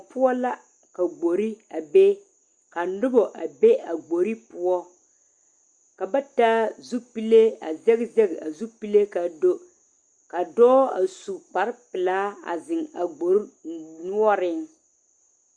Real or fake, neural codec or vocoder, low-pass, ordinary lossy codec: real; none; 9.9 kHz; Opus, 64 kbps